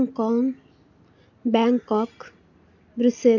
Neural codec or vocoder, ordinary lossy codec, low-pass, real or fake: none; none; 7.2 kHz; real